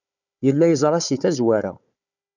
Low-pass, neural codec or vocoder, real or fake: 7.2 kHz; codec, 16 kHz, 4 kbps, FunCodec, trained on Chinese and English, 50 frames a second; fake